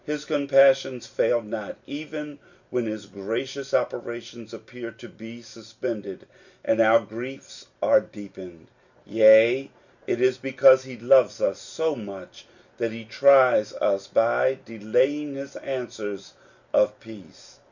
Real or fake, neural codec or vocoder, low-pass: real; none; 7.2 kHz